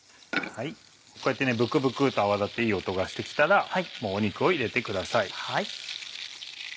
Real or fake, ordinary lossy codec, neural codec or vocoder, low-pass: real; none; none; none